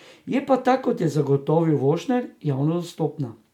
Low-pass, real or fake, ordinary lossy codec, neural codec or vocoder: 19.8 kHz; real; MP3, 96 kbps; none